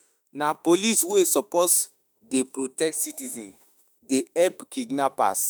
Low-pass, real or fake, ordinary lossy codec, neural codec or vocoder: none; fake; none; autoencoder, 48 kHz, 32 numbers a frame, DAC-VAE, trained on Japanese speech